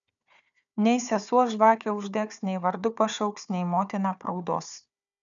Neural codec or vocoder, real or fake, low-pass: codec, 16 kHz, 4 kbps, FunCodec, trained on Chinese and English, 50 frames a second; fake; 7.2 kHz